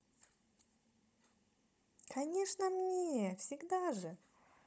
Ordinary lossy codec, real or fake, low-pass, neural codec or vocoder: none; fake; none; codec, 16 kHz, 16 kbps, FunCodec, trained on Chinese and English, 50 frames a second